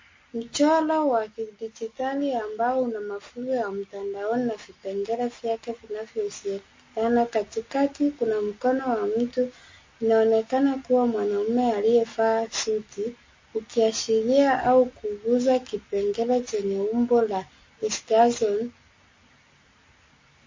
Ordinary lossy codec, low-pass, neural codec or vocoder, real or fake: MP3, 32 kbps; 7.2 kHz; none; real